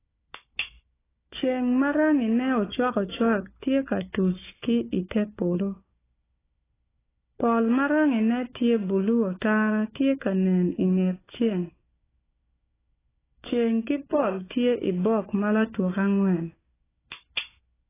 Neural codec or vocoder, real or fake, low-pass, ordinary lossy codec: codec, 16 kHz, 4 kbps, FunCodec, trained on Chinese and English, 50 frames a second; fake; 3.6 kHz; AAC, 16 kbps